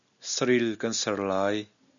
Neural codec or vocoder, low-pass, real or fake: none; 7.2 kHz; real